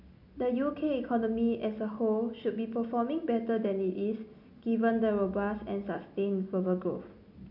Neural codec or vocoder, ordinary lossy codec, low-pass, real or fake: none; MP3, 48 kbps; 5.4 kHz; real